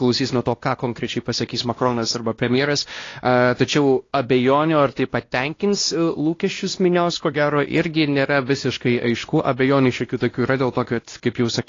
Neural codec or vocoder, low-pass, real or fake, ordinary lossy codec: codec, 16 kHz, 1 kbps, X-Codec, WavLM features, trained on Multilingual LibriSpeech; 7.2 kHz; fake; AAC, 32 kbps